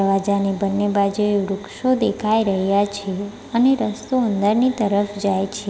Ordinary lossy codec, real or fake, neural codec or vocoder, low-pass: none; real; none; none